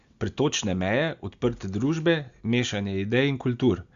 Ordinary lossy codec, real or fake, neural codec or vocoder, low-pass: Opus, 64 kbps; real; none; 7.2 kHz